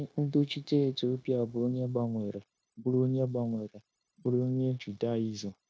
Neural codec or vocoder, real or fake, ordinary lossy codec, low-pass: codec, 16 kHz, 0.9 kbps, LongCat-Audio-Codec; fake; none; none